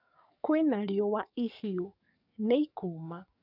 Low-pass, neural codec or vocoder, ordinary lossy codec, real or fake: 5.4 kHz; codec, 44.1 kHz, 7.8 kbps, DAC; none; fake